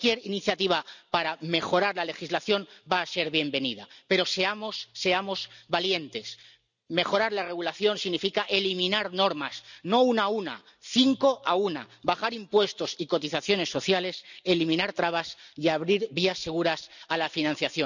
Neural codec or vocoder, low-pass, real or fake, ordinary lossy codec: none; 7.2 kHz; real; none